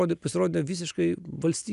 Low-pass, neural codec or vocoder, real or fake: 10.8 kHz; none; real